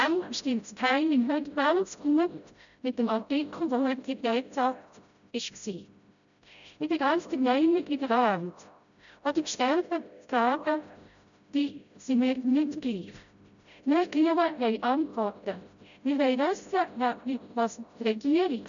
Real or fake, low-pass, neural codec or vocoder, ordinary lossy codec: fake; 7.2 kHz; codec, 16 kHz, 0.5 kbps, FreqCodec, smaller model; none